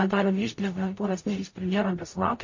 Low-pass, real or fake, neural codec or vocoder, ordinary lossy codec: 7.2 kHz; fake; codec, 44.1 kHz, 0.9 kbps, DAC; MP3, 32 kbps